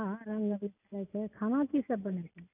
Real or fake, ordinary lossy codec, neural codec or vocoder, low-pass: real; none; none; 3.6 kHz